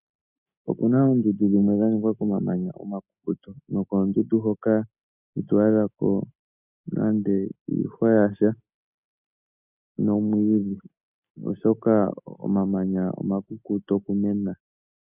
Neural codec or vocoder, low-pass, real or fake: none; 3.6 kHz; real